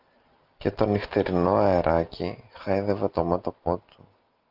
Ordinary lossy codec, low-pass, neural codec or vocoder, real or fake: Opus, 32 kbps; 5.4 kHz; none; real